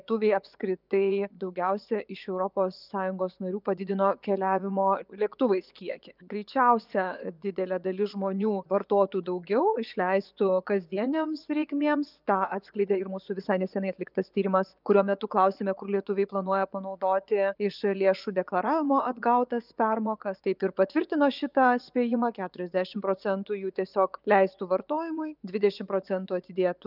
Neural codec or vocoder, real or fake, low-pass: vocoder, 24 kHz, 100 mel bands, Vocos; fake; 5.4 kHz